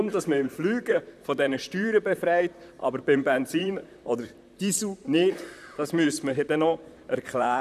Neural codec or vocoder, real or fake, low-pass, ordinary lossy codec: vocoder, 44.1 kHz, 128 mel bands, Pupu-Vocoder; fake; 14.4 kHz; none